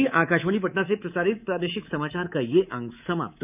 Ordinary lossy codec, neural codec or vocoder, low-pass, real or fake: none; codec, 24 kHz, 3.1 kbps, DualCodec; 3.6 kHz; fake